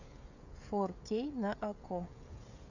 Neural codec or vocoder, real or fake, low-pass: codec, 16 kHz, 16 kbps, FreqCodec, smaller model; fake; 7.2 kHz